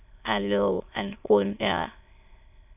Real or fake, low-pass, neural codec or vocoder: fake; 3.6 kHz; autoencoder, 22.05 kHz, a latent of 192 numbers a frame, VITS, trained on many speakers